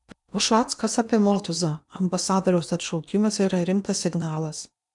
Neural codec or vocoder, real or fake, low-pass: codec, 16 kHz in and 24 kHz out, 0.8 kbps, FocalCodec, streaming, 65536 codes; fake; 10.8 kHz